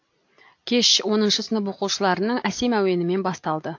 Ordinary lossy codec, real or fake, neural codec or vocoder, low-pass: AAC, 48 kbps; real; none; 7.2 kHz